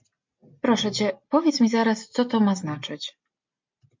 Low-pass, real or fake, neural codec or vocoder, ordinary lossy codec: 7.2 kHz; real; none; MP3, 48 kbps